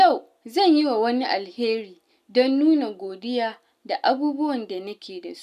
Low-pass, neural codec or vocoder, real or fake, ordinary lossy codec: 14.4 kHz; none; real; none